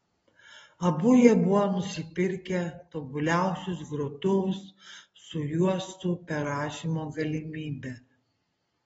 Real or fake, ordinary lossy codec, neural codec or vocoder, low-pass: real; AAC, 24 kbps; none; 10.8 kHz